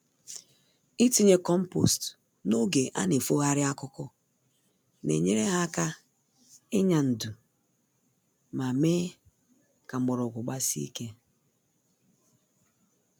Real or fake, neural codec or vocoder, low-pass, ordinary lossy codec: real; none; none; none